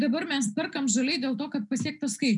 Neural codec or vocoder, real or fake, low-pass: none; real; 10.8 kHz